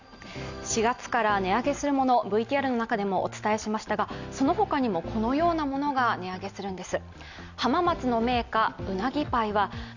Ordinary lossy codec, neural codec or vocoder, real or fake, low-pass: none; none; real; 7.2 kHz